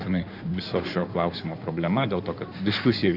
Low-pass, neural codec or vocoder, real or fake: 5.4 kHz; codec, 16 kHz in and 24 kHz out, 2.2 kbps, FireRedTTS-2 codec; fake